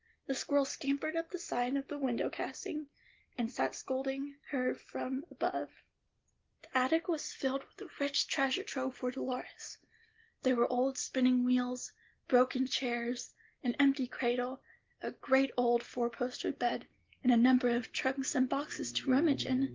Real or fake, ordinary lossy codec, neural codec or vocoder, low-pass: real; Opus, 16 kbps; none; 7.2 kHz